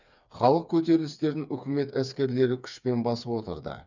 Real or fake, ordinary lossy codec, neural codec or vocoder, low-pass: fake; none; codec, 16 kHz, 4 kbps, FreqCodec, smaller model; 7.2 kHz